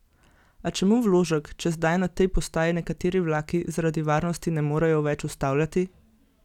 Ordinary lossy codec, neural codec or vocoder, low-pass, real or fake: none; none; 19.8 kHz; real